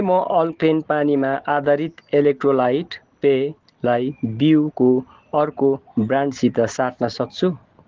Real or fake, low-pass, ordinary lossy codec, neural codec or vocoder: real; 7.2 kHz; Opus, 16 kbps; none